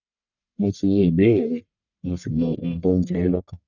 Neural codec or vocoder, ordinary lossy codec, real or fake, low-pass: codec, 44.1 kHz, 1.7 kbps, Pupu-Codec; none; fake; 7.2 kHz